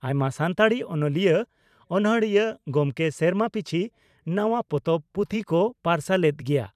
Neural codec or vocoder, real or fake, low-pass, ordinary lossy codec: vocoder, 44.1 kHz, 128 mel bands every 512 samples, BigVGAN v2; fake; 14.4 kHz; none